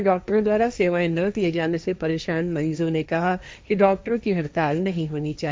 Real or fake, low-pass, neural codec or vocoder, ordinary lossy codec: fake; none; codec, 16 kHz, 1.1 kbps, Voila-Tokenizer; none